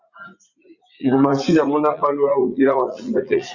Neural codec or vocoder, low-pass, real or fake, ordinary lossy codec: vocoder, 44.1 kHz, 80 mel bands, Vocos; 7.2 kHz; fake; Opus, 64 kbps